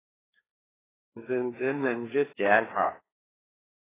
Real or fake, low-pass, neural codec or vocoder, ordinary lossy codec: fake; 3.6 kHz; codec, 16 kHz, 2 kbps, FreqCodec, larger model; AAC, 16 kbps